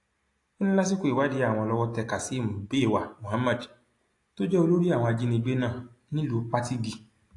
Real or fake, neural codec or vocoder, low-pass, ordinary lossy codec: real; none; 10.8 kHz; AAC, 48 kbps